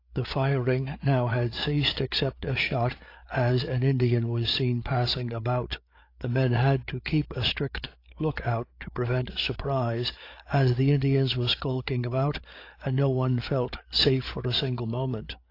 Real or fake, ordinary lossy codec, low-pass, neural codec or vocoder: fake; AAC, 32 kbps; 5.4 kHz; codec, 16 kHz, 8 kbps, FreqCodec, larger model